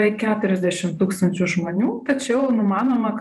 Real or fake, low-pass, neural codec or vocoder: fake; 14.4 kHz; vocoder, 44.1 kHz, 128 mel bands every 512 samples, BigVGAN v2